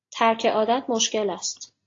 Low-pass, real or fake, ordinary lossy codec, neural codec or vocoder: 7.2 kHz; real; AAC, 32 kbps; none